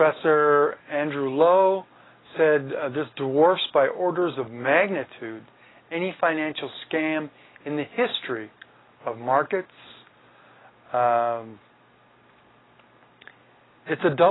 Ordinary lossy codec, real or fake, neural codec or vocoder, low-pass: AAC, 16 kbps; real; none; 7.2 kHz